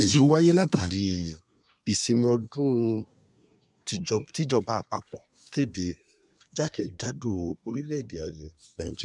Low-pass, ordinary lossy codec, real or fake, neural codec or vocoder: 10.8 kHz; none; fake; codec, 24 kHz, 1 kbps, SNAC